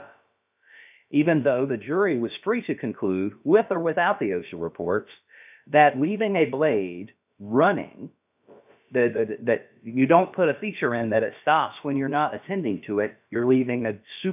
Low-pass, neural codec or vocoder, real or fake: 3.6 kHz; codec, 16 kHz, about 1 kbps, DyCAST, with the encoder's durations; fake